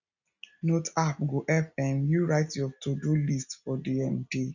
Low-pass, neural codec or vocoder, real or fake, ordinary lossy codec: 7.2 kHz; none; real; none